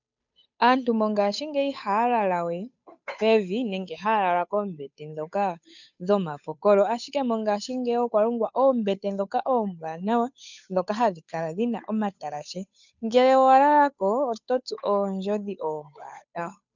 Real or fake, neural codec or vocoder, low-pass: fake; codec, 16 kHz, 8 kbps, FunCodec, trained on Chinese and English, 25 frames a second; 7.2 kHz